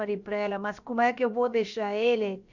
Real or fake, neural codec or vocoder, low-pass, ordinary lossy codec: fake; codec, 16 kHz, about 1 kbps, DyCAST, with the encoder's durations; 7.2 kHz; none